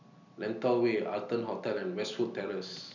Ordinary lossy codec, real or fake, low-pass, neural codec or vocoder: none; real; 7.2 kHz; none